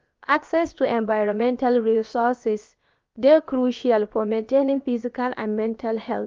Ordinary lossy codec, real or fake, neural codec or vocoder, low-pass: Opus, 32 kbps; fake; codec, 16 kHz, about 1 kbps, DyCAST, with the encoder's durations; 7.2 kHz